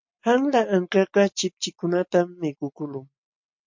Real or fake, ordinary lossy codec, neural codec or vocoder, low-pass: real; MP3, 48 kbps; none; 7.2 kHz